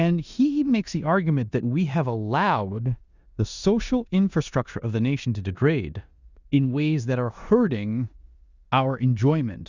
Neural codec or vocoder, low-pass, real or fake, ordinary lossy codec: codec, 16 kHz in and 24 kHz out, 0.9 kbps, LongCat-Audio-Codec, four codebook decoder; 7.2 kHz; fake; Opus, 64 kbps